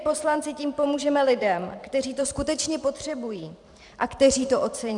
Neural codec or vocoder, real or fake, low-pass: vocoder, 44.1 kHz, 128 mel bands every 512 samples, BigVGAN v2; fake; 10.8 kHz